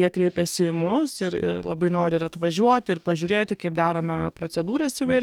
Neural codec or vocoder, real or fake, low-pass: codec, 44.1 kHz, 2.6 kbps, DAC; fake; 19.8 kHz